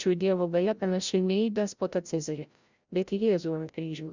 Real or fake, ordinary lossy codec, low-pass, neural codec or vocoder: fake; Opus, 64 kbps; 7.2 kHz; codec, 16 kHz, 0.5 kbps, FreqCodec, larger model